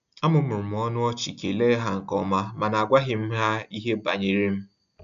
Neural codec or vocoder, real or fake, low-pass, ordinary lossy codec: none; real; 7.2 kHz; none